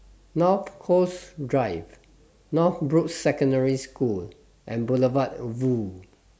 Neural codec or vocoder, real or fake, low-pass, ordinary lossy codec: none; real; none; none